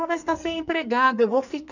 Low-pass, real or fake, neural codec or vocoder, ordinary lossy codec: 7.2 kHz; fake; codec, 44.1 kHz, 2.6 kbps, SNAC; none